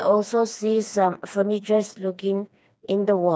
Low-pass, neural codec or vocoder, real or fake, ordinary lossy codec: none; codec, 16 kHz, 2 kbps, FreqCodec, smaller model; fake; none